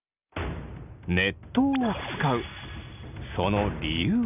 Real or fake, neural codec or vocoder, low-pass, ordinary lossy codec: real; none; 3.6 kHz; none